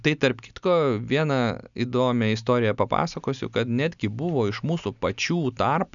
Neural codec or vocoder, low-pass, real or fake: none; 7.2 kHz; real